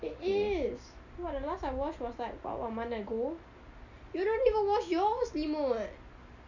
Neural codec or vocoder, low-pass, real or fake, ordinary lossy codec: none; 7.2 kHz; real; none